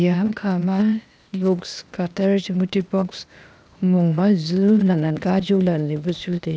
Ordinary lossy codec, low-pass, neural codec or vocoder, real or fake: none; none; codec, 16 kHz, 0.8 kbps, ZipCodec; fake